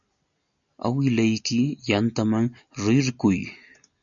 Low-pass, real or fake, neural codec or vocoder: 7.2 kHz; real; none